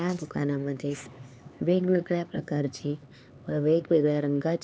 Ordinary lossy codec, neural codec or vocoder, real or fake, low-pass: none; codec, 16 kHz, 4 kbps, X-Codec, HuBERT features, trained on LibriSpeech; fake; none